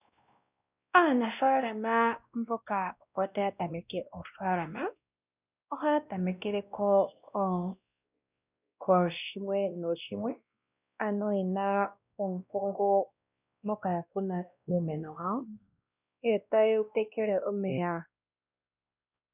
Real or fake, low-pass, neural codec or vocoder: fake; 3.6 kHz; codec, 16 kHz, 1 kbps, X-Codec, WavLM features, trained on Multilingual LibriSpeech